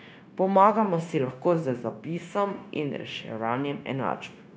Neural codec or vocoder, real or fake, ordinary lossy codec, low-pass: codec, 16 kHz, 0.9 kbps, LongCat-Audio-Codec; fake; none; none